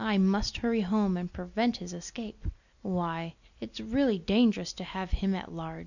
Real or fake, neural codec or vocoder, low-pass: real; none; 7.2 kHz